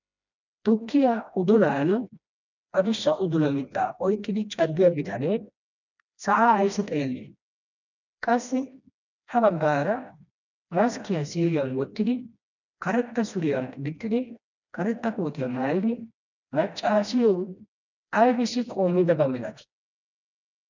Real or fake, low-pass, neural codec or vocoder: fake; 7.2 kHz; codec, 16 kHz, 1 kbps, FreqCodec, smaller model